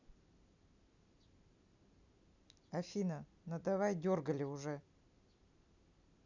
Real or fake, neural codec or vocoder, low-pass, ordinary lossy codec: real; none; 7.2 kHz; none